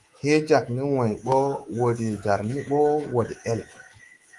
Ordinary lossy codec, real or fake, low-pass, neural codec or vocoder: Opus, 24 kbps; fake; 10.8 kHz; codec, 24 kHz, 3.1 kbps, DualCodec